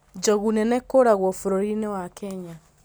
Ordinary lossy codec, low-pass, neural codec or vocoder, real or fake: none; none; none; real